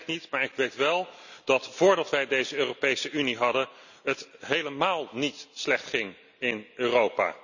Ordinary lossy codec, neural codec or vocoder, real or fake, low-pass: none; none; real; 7.2 kHz